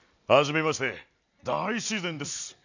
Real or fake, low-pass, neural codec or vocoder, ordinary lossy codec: real; 7.2 kHz; none; none